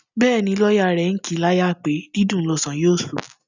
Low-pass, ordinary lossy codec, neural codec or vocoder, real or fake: 7.2 kHz; none; none; real